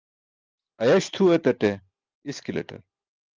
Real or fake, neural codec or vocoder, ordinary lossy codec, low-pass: real; none; Opus, 24 kbps; 7.2 kHz